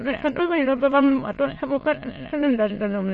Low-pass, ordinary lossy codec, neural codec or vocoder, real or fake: 9.9 kHz; MP3, 32 kbps; autoencoder, 22.05 kHz, a latent of 192 numbers a frame, VITS, trained on many speakers; fake